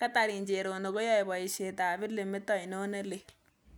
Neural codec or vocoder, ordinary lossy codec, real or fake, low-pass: none; none; real; none